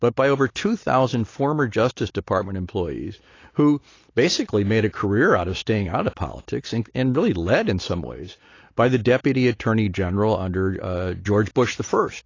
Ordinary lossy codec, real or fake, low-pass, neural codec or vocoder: AAC, 32 kbps; real; 7.2 kHz; none